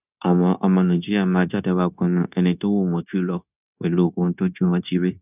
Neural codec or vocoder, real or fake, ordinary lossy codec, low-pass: codec, 16 kHz, 0.9 kbps, LongCat-Audio-Codec; fake; none; 3.6 kHz